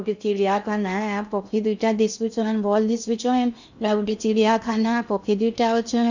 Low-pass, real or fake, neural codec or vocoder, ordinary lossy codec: 7.2 kHz; fake; codec, 16 kHz in and 24 kHz out, 0.6 kbps, FocalCodec, streaming, 2048 codes; none